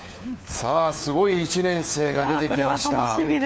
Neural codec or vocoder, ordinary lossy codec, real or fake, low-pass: codec, 16 kHz, 4 kbps, FunCodec, trained on LibriTTS, 50 frames a second; none; fake; none